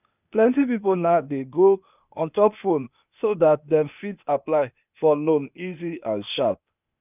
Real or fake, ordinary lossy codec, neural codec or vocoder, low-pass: fake; none; codec, 16 kHz, 0.8 kbps, ZipCodec; 3.6 kHz